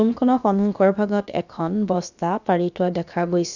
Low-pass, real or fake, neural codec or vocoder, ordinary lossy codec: 7.2 kHz; fake; codec, 16 kHz, about 1 kbps, DyCAST, with the encoder's durations; none